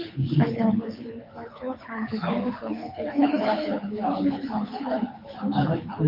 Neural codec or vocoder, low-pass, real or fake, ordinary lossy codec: codec, 24 kHz, 6 kbps, HILCodec; 5.4 kHz; fake; MP3, 32 kbps